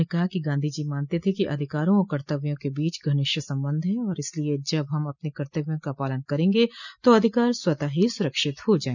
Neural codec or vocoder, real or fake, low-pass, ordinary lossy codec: none; real; 7.2 kHz; none